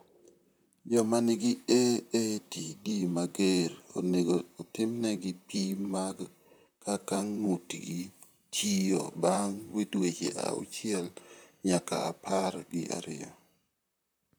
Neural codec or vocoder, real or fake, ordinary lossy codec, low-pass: vocoder, 44.1 kHz, 128 mel bands, Pupu-Vocoder; fake; none; none